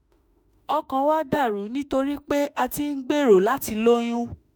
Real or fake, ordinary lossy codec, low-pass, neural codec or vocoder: fake; none; none; autoencoder, 48 kHz, 32 numbers a frame, DAC-VAE, trained on Japanese speech